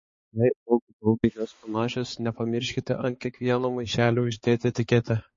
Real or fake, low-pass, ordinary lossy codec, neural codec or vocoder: fake; 7.2 kHz; MP3, 32 kbps; codec, 16 kHz, 4 kbps, X-Codec, HuBERT features, trained on balanced general audio